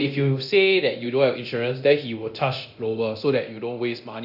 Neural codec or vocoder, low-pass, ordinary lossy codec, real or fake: codec, 24 kHz, 0.9 kbps, DualCodec; 5.4 kHz; AAC, 48 kbps; fake